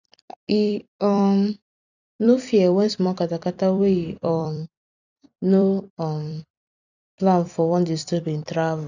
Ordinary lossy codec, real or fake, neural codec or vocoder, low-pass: none; fake; vocoder, 44.1 kHz, 128 mel bands every 256 samples, BigVGAN v2; 7.2 kHz